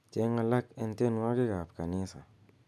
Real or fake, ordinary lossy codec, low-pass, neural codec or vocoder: real; none; none; none